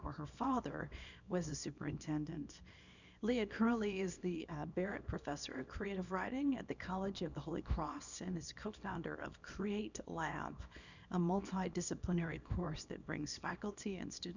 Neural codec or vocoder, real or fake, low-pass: codec, 24 kHz, 0.9 kbps, WavTokenizer, small release; fake; 7.2 kHz